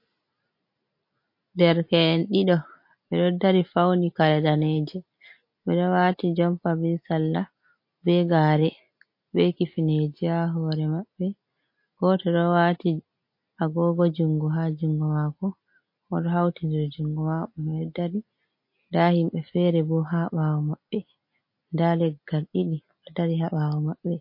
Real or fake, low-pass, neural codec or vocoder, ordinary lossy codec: real; 5.4 kHz; none; MP3, 32 kbps